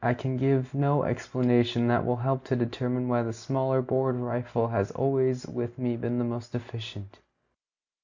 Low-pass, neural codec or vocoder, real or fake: 7.2 kHz; none; real